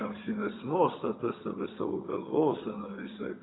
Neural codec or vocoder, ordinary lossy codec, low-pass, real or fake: vocoder, 22.05 kHz, 80 mel bands, HiFi-GAN; AAC, 16 kbps; 7.2 kHz; fake